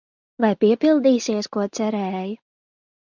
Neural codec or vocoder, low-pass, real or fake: none; 7.2 kHz; real